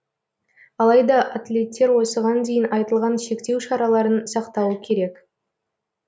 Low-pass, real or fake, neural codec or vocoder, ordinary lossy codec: none; real; none; none